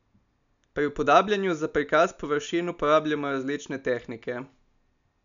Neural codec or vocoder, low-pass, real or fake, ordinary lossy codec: none; 7.2 kHz; real; none